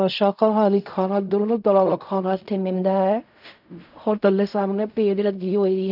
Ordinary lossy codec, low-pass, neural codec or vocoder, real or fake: none; 5.4 kHz; codec, 16 kHz in and 24 kHz out, 0.4 kbps, LongCat-Audio-Codec, fine tuned four codebook decoder; fake